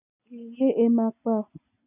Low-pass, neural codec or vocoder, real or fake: 3.6 kHz; none; real